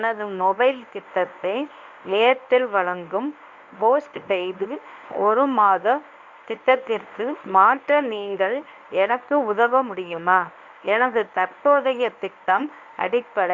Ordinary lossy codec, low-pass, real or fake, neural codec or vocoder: Opus, 64 kbps; 7.2 kHz; fake; codec, 24 kHz, 0.9 kbps, WavTokenizer, medium speech release version 1